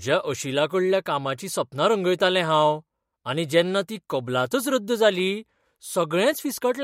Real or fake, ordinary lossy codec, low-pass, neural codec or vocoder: real; MP3, 64 kbps; 19.8 kHz; none